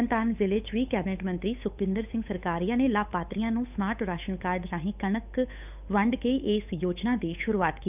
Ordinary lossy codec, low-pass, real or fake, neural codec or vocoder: none; 3.6 kHz; fake; codec, 16 kHz, 8 kbps, FunCodec, trained on Chinese and English, 25 frames a second